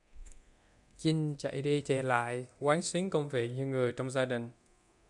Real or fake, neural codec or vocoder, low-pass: fake; codec, 24 kHz, 0.9 kbps, DualCodec; 10.8 kHz